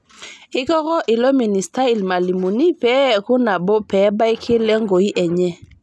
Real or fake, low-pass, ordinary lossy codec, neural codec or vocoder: real; none; none; none